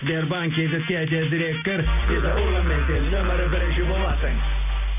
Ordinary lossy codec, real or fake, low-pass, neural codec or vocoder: none; real; 3.6 kHz; none